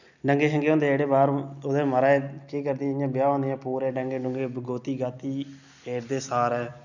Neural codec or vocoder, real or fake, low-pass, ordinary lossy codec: none; real; 7.2 kHz; none